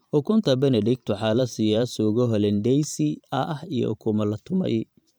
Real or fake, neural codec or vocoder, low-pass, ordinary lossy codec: fake; vocoder, 44.1 kHz, 128 mel bands every 512 samples, BigVGAN v2; none; none